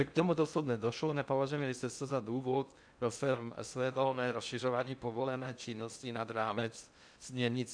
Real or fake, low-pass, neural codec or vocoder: fake; 9.9 kHz; codec, 16 kHz in and 24 kHz out, 0.6 kbps, FocalCodec, streaming, 2048 codes